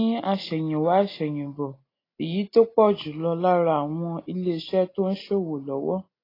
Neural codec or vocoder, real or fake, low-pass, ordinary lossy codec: none; real; 5.4 kHz; AAC, 24 kbps